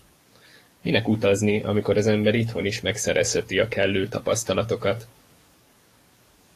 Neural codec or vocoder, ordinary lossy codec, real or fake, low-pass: codec, 44.1 kHz, 7.8 kbps, DAC; AAC, 48 kbps; fake; 14.4 kHz